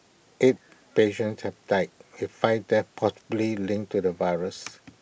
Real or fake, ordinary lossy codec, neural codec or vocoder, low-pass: real; none; none; none